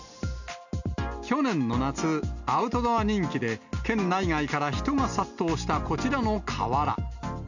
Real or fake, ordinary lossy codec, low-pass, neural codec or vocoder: real; none; 7.2 kHz; none